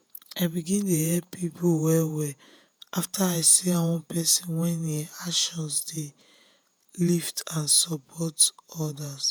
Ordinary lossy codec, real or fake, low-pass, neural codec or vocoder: none; fake; none; vocoder, 48 kHz, 128 mel bands, Vocos